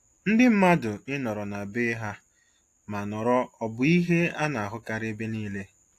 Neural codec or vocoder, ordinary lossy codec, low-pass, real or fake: none; AAC, 64 kbps; 14.4 kHz; real